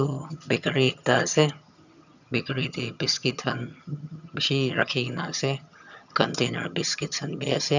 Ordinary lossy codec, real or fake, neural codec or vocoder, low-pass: none; fake; vocoder, 22.05 kHz, 80 mel bands, HiFi-GAN; 7.2 kHz